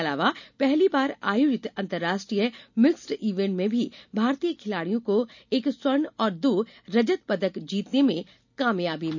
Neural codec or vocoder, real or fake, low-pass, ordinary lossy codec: none; real; 7.2 kHz; none